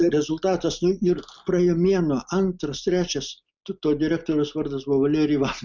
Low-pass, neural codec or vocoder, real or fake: 7.2 kHz; none; real